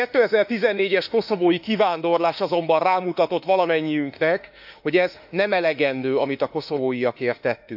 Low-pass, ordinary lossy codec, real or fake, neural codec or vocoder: 5.4 kHz; none; fake; autoencoder, 48 kHz, 32 numbers a frame, DAC-VAE, trained on Japanese speech